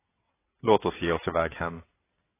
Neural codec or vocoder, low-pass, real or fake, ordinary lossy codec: vocoder, 22.05 kHz, 80 mel bands, WaveNeXt; 3.6 kHz; fake; AAC, 16 kbps